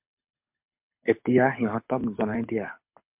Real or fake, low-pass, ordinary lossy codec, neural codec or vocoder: fake; 3.6 kHz; MP3, 32 kbps; codec, 24 kHz, 6 kbps, HILCodec